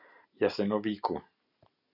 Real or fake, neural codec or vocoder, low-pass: real; none; 5.4 kHz